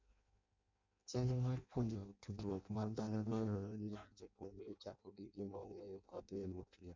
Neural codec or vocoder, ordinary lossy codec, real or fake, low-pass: codec, 16 kHz in and 24 kHz out, 0.6 kbps, FireRedTTS-2 codec; MP3, 64 kbps; fake; 7.2 kHz